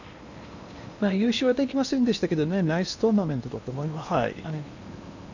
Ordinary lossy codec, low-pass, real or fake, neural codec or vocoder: none; 7.2 kHz; fake; codec, 16 kHz in and 24 kHz out, 0.8 kbps, FocalCodec, streaming, 65536 codes